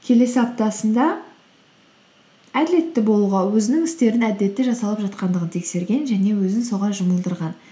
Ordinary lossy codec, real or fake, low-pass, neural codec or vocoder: none; real; none; none